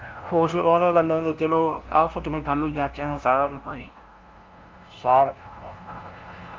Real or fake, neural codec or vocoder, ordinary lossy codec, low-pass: fake; codec, 16 kHz, 0.5 kbps, FunCodec, trained on LibriTTS, 25 frames a second; Opus, 32 kbps; 7.2 kHz